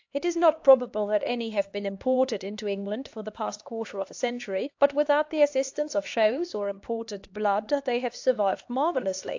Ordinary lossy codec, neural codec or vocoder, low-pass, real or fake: AAC, 48 kbps; codec, 16 kHz, 2 kbps, X-Codec, HuBERT features, trained on LibriSpeech; 7.2 kHz; fake